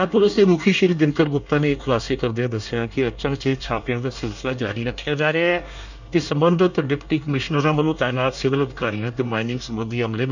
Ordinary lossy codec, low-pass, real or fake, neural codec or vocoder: none; 7.2 kHz; fake; codec, 24 kHz, 1 kbps, SNAC